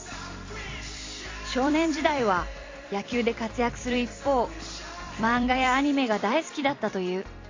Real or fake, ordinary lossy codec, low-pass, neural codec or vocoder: fake; AAC, 32 kbps; 7.2 kHz; vocoder, 44.1 kHz, 128 mel bands every 256 samples, BigVGAN v2